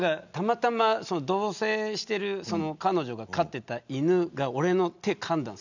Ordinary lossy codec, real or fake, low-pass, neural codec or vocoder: none; real; 7.2 kHz; none